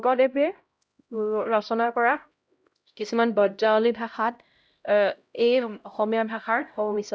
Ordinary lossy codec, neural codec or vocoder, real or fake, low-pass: none; codec, 16 kHz, 0.5 kbps, X-Codec, HuBERT features, trained on LibriSpeech; fake; none